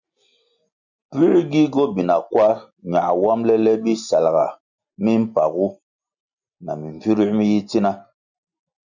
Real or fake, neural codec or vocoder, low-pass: real; none; 7.2 kHz